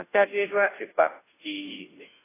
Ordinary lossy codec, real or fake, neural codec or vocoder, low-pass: AAC, 16 kbps; fake; codec, 16 kHz, 0.5 kbps, FunCodec, trained on Chinese and English, 25 frames a second; 3.6 kHz